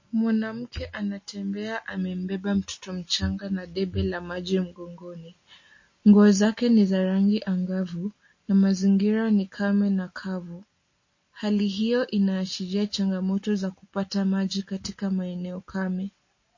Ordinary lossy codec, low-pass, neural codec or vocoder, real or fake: MP3, 32 kbps; 7.2 kHz; none; real